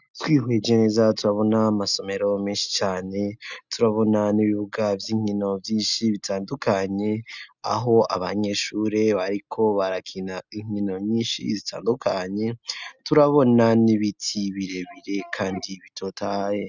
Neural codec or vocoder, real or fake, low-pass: none; real; 7.2 kHz